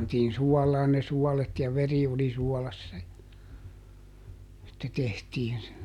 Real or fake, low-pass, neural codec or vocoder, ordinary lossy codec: real; 19.8 kHz; none; none